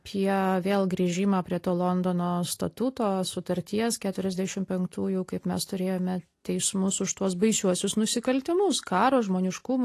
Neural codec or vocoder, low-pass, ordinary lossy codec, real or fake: none; 14.4 kHz; AAC, 48 kbps; real